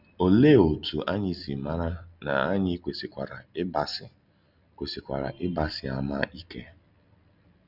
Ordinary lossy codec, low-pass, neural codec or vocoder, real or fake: none; 5.4 kHz; none; real